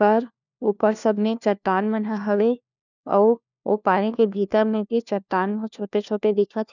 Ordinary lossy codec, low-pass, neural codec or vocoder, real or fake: none; 7.2 kHz; codec, 16 kHz, 1 kbps, FunCodec, trained on LibriTTS, 50 frames a second; fake